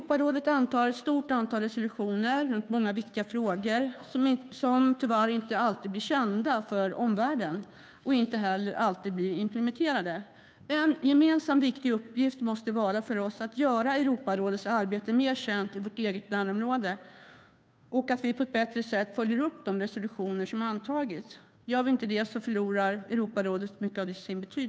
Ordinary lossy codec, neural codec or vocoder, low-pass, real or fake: none; codec, 16 kHz, 2 kbps, FunCodec, trained on Chinese and English, 25 frames a second; none; fake